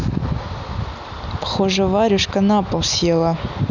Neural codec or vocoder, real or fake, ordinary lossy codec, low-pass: none; real; none; 7.2 kHz